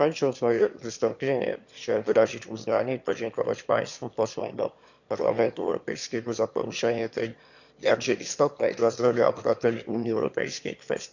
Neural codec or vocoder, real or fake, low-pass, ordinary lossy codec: autoencoder, 22.05 kHz, a latent of 192 numbers a frame, VITS, trained on one speaker; fake; 7.2 kHz; none